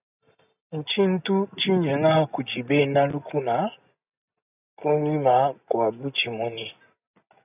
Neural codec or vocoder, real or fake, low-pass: none; real; 3.6 kHz